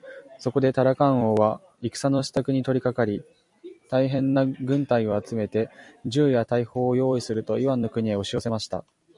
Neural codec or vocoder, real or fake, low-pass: vocoder, 44.1 kHz, 128 mel bands every 256 samples, BigVGAN v2; fake; 10.8 kHz